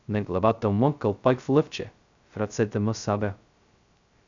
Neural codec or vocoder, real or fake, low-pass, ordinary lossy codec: codec, 16 kHz, 0.2 kbps, FocalCodec; fake; 7.2 kHz; AAC, 64 kbps